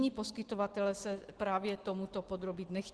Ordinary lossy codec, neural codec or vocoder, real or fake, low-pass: Opus, 16 kbps; none; real; 10.8 kHz